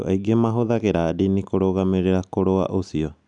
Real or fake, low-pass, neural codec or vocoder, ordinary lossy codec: real; 9.9 kHz; none; none